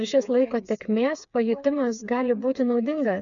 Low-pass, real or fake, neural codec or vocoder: 7.2 kHz; fake; codec, 16 kHz, 4 kbps, FreqCodec, smaller model